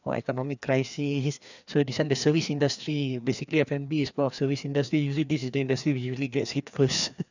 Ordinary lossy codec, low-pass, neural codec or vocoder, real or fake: none; 7.2 kHz; codec, 16 kHz, 2 kbps, FreqCodec, larger model; fake